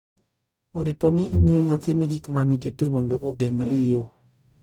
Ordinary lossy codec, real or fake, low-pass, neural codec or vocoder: none; fake; 19.8 kHz; codec, 44.1 kHz, 0.9 kbps, DAC